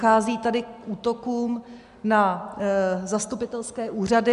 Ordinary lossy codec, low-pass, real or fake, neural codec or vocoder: MP3, 96 kbps; 10.8 kHz; real; none